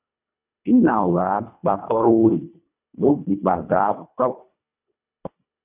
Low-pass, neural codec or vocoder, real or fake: 3.6 kHz; codec, 24 kHz, 1.5 kbps, HILCodec; fake